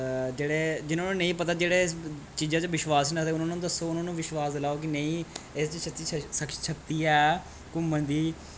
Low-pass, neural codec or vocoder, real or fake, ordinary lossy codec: none; none; real; none